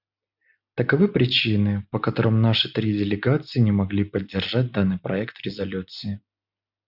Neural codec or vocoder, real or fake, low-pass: none; real; 5.4 kHz